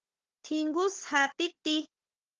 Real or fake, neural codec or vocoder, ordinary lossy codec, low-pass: fake; codec, 16 kHz, 4 kbps, FunCodec, trained on Chinese and English, 50 frames a second; Opus, 16 kbps; 7.2 kHz